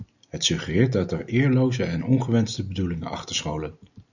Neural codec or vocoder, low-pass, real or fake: none; 7.2 kHz; real